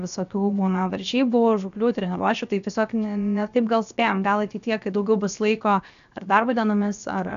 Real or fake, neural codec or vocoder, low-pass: fake; codec, 16 kHz, 0.7 kbps, FocalCodec; 7.2 kHz